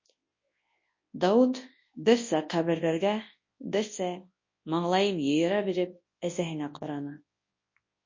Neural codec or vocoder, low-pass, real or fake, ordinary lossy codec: codec, 24 kHz, 0.9 kbps, WavTokenizer, large speech release; 7.2 kHz; fake; MP3, 32 kbps